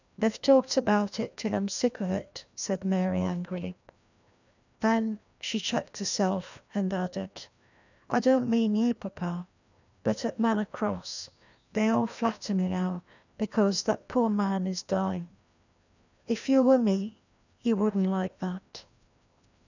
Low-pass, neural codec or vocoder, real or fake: 7.2 kHz; codec, 16 kHz, 1 kbps, FreqCodec, larger model; fake